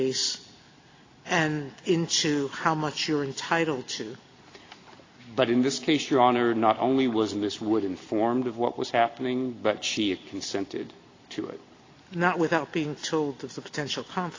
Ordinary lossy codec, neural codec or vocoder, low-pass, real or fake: AAC, 32 kbps; none; 7.2 kHz; real